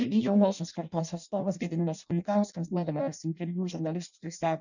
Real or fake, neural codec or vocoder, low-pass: fake; codec, 16 kHz in and 24 kHz out, 0.6 kbps, FireRedTTS-2 codec; 7.2 kHz